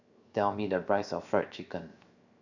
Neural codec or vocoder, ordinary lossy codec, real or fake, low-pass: codec, 16 kHz, 0.7 kbps, FocalCodec; none; fake; 7.2 kHz